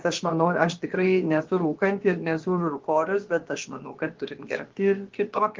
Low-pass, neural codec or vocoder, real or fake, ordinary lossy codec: 7.2 kHz; codec, 16 kHz, about 1 kbps, DyCAST, with the encoder's durations; fake; Opus, 16 kbps